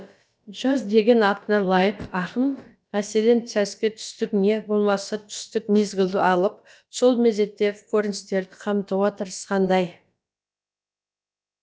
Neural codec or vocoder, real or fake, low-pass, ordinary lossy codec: codec, 16 kHz, about 1 kbps, DyCAST, with the encoder's durations; fake; none; none